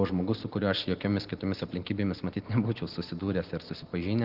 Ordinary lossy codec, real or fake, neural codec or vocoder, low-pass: Opus, 16 kbps; real; none; 5.4 kHz